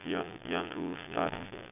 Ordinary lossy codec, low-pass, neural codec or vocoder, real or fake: none; 3.6 kHz; vocoder, 22.05 kHz, 80 mel bands, Vocos; fake